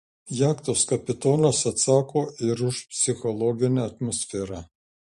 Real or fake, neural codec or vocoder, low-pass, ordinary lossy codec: fake; vocoder, 44.1 kHz, 128 mel bands every 512 samples, BigVGAN v2; 14.4 kHz; MP3, 48 kbps